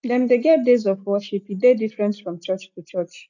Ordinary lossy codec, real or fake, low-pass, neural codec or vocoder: none; real; 7.2 kHz; none